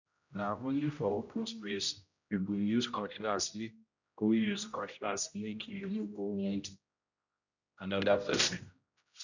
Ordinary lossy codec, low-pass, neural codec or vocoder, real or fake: none; 7.2 kHz; codec, 16 kHz, 0.5 kbps, X-Codec, HuBERT features, trained on general audio; fake